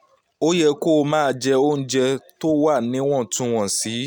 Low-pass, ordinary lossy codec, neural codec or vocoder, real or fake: none; none; none; real